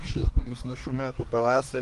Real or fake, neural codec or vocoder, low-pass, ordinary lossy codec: fake; codec, 24 kHz, 1 kbps, SNAC; 10.8 kHz; Opus, 24 kbps